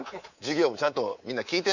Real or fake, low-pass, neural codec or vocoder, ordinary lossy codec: fake; 7.2 kHz; codec, 24 kHz, 3.1 kbps, DualCodec; Opus, 64 kbps